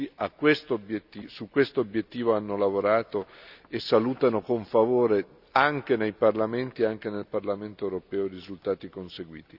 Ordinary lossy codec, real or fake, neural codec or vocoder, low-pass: none; real; none; 5.4 kHz